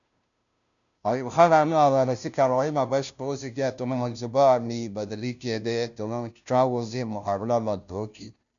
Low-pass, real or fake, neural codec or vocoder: 7.2 kHz; fake; codec, 16 kHz, 0.5 kbps, FunCodec, trained on Chinese and English, 25 frames a second